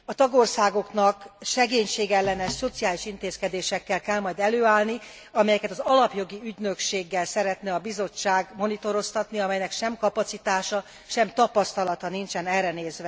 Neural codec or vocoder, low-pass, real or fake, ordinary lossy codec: none; none; real; none